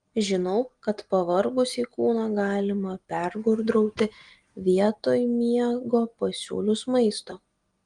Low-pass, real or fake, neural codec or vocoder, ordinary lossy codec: 9.9 kHz; real; none; Opus, 24 kbps